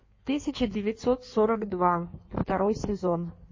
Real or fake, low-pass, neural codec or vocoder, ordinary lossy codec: fake; 7.2 kHz; codec, 16 kHz in and 24 kHz out, 1.1 kbps, FireRedTTS-2 codec; MP3, 32 kbps